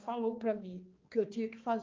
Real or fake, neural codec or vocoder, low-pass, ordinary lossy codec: fake; codec, 16 kHz, 2 kbps, X-Codec, HuBERT features, trained on balanced general audio; 7.2 kHz; Opus, 24 kbps